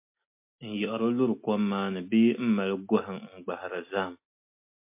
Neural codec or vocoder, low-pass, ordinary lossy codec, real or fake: none; 3.6 kHz; MP3, 24 kbps; real